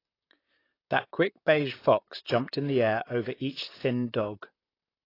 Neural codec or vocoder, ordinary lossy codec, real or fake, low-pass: vocoder, 44.1 kHz, 128 mel bands, Pupu-Vocoder; AAC, 24 kbps; fake; 5.4 kHz